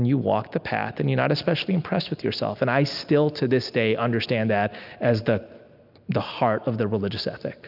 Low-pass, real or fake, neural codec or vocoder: 5.4 kHz; real; none